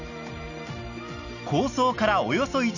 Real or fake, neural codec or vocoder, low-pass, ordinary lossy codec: real; none; 7.2 kHz; none